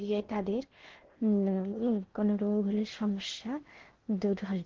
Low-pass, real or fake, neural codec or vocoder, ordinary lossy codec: 7.2 kHz; fake; codec, 16 kHz in and 24 kHz out, 0.6 kbps, FocalCodec, streaming, 2048 codes; Opus, 16 kbps